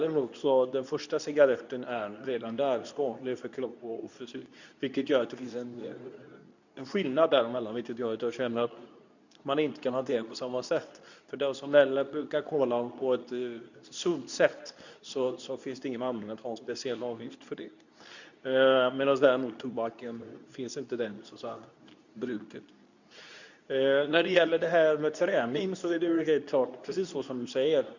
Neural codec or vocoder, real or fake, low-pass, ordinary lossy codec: codec, 24 kHz, 0.9 kbps, WavTokenizer, medium speech release version 2; fake; 7.2 kHz; none